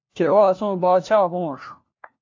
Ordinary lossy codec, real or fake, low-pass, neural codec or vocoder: AAC, 48 kbps; fake; 7.2 kHz; codec, 16 kHz, 1 kbps, FunCodec, trained on LibriTTS, 50 frames a second